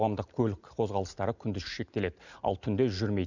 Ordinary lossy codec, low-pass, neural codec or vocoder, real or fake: none; 7.2 kHz; none; real